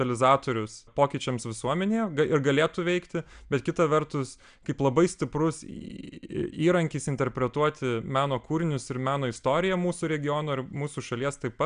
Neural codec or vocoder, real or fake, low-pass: none; real; 9.9 kHz